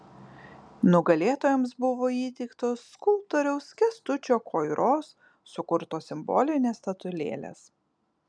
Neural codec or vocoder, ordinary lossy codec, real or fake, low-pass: none; MP3, 96 kbps; real; 9.9 kHz